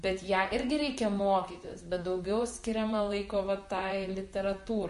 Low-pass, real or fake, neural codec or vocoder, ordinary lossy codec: 14.4 kHz; fake; vocoder, 44.1 kHz, 128 mel bands, Pupu-Vocoder; MP3, 48 kbps